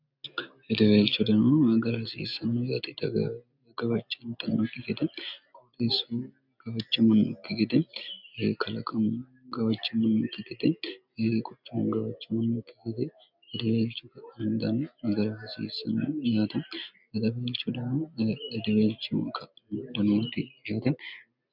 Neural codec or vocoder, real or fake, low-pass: none; real; 5.4 kHz